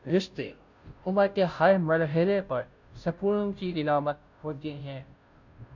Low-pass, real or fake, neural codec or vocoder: 7.2 kHz; fake; codec, 16 kHz, 0.5 kbps, FunCodec, trained on Chinese and English, 25 frames a second